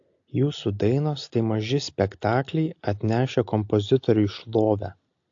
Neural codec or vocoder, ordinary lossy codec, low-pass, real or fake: none; AAC, 48 kbps; 7.2 kHz; real